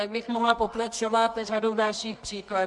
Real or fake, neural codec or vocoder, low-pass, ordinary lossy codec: fake; codec, 24 kHz, 0.9 kbps, WavTokenizer, medium music audio release; 10.8 kHz; MP3, 64 kbps